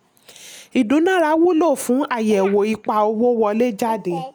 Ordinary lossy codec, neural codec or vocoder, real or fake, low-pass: none; none; real; none